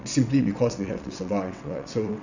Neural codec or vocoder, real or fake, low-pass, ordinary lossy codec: vocoder, 22.05 kHz, 80 mel bands, WaveNeXt; fake; 7.2 kHz; none